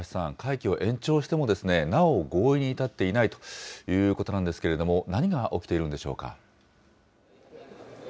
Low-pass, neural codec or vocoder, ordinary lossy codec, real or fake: none; none; none; real